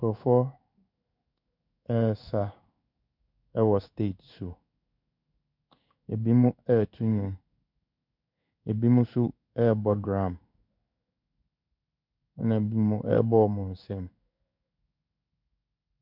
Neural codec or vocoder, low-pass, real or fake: codec, 16 kHz in and 24 kHz out, 1 kbps, XY-Tokenizer; 5.4 kHz; fake